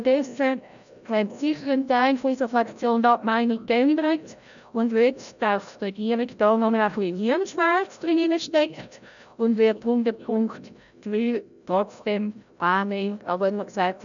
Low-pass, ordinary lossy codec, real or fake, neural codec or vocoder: 7.2 kHz; none; fake; codec, 16 kHz, 0.5 kbps, FreqCodec, larger model